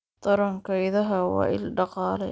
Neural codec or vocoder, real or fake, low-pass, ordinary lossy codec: none; real; none; none